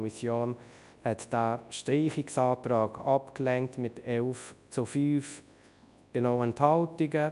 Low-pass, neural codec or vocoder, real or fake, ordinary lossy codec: 10.8 kHz; codec, 24 kHz, 0.9 kbps, WavTokenizer, large speech release; fake; none